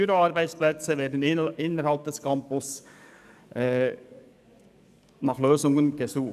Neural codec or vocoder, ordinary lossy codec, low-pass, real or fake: codec, 44.1 kHz, 7.8 kbps, DAC; none; 14.4 kHz; fake